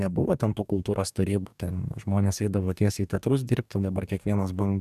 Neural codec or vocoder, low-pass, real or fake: codec, 44.1 kHz, 2.6 kbps, DAC; 14.4 kHz; fake